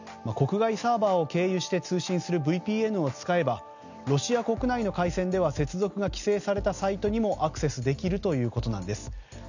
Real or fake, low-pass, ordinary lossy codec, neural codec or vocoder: real; 7.2 kHz; none; none